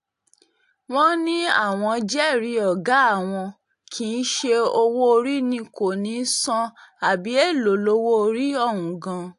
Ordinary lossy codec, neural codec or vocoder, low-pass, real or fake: AAC, 64 kbps; none; 10.8 kHz; real